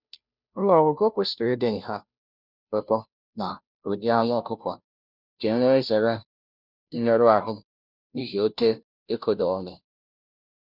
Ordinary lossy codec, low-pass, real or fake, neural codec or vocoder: none; 5.4 kHz; fake; codec, 16 kHz, 0.5 kbps, FunCodec, trained on Chinese and English, 25 frames a second